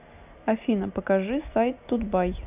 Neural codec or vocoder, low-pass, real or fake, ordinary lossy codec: none; 3.6 kHz; real; none